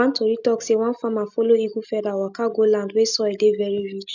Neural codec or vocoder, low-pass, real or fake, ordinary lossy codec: none; 7.2 kHz; real; none